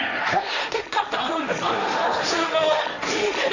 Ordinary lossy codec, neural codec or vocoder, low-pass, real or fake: none; codec, 16 kHz, 1.1 kbps, Voila-Tokenizer; 7.2 kHz; fake